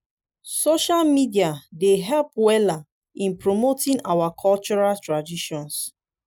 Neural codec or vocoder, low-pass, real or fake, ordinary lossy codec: none; none; real; none